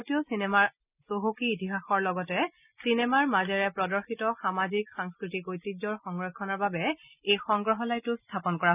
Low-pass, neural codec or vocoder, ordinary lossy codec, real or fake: 3.6 kHz; none; none; real